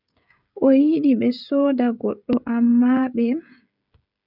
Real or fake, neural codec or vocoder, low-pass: fake; codec, 16 kHz, 16 kbps, FreqCodec, smaller model; 5.4 kHz